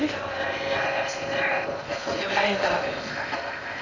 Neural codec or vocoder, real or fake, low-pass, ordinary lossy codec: codec, 16 kHz in and 24 kHz out, 0.6 kbps, FocalCodec, streaming, 2048 codes; fake; 7.2 kHz; none